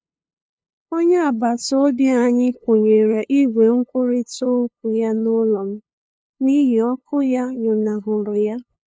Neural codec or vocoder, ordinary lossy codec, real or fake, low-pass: codec, 16 kHz, 2 kbps, FunCodec, trained on LibriTTS, 25 frames a second; none; fake; none